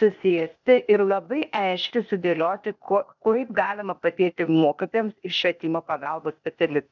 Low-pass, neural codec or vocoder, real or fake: 7.2 kHz; codec, 16 kHz, 0.8 kbps, ZipCodec; fake